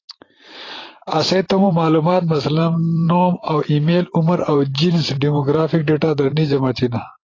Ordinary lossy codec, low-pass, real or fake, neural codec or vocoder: AAC, 32 kbps; 7.2 kHz; fake; vocoder, 44.1 kHz, 128 mel bands every 512 samples, BigVGAN v2